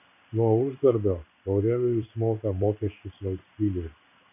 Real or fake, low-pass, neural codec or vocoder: fake; 3.6 kHz; codec, 16 kHz in and 24 kHz out, 1 kbps, XY-Tokenizer